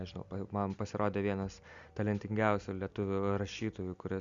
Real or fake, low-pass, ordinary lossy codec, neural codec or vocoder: real; 7.2 kHz; Opus, 64 kbps; none